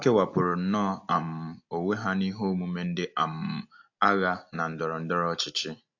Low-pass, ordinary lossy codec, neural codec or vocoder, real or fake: 7.2 kHz; AAC, 48 kbps; none; real